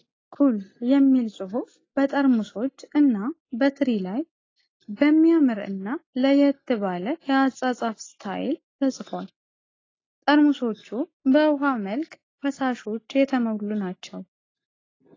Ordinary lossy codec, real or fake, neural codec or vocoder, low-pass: AAC, 32 kbps; real; none; 7.2 kHz